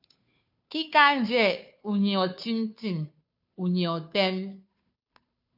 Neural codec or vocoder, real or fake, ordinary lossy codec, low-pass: codec, 16 kHz, 2 kbps, FunCodec, trained on Chinese and English, 25 frames a second; fake; AAC, 48 kbps; 5.4 kHz